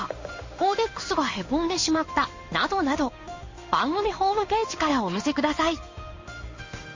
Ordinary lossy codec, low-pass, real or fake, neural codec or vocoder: MP3, 32 kbps; 7.2 kHz; fake; codec, 16 kHz in and 24 kHz out, 1 kbps, XY-Tokenizer